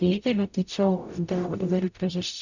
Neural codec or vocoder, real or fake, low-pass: codec, 44.1 kHz, 0.9 kbps, DAC; fake; 7.2 kHz